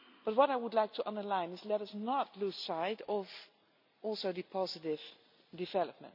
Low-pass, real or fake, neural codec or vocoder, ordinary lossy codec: 5.4 kHz; real; none; none